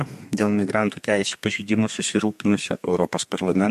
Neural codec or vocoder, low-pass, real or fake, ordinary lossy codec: codec, 32 kHz, 1.9 kbps, SNAC; 14.4 kHz; fake; MP3, 64 kbps